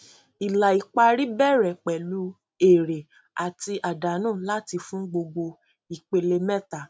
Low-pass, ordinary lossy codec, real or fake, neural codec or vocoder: none; none; real; none